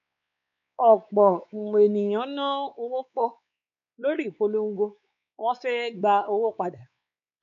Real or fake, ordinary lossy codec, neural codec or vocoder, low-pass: fake; none; codec, 16 kHz, 4 kbps, X-Codec, WavLM features, trained on Multilingual LibriSpeech; 7.2 kHz